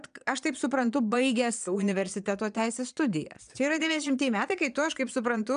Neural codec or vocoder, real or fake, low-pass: vocoder, 22.05 kHz, 80 mel bands, Vocos; fake; 9.9 kHz